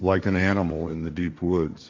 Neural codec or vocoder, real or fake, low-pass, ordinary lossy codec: codec, 16 kHz, 1.1 kbps, Voila-Tokenizer; fake; 7.2 kHz; AAC, 48 kbps